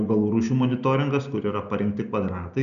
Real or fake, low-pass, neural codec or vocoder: real; 7.2 kHz; none